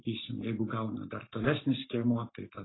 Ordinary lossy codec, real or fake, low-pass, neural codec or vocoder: AAC, 16 kbps; fake; 7.2 kHz; codec, 44.1 kHz, 7.8 kbps, Pupu-Codec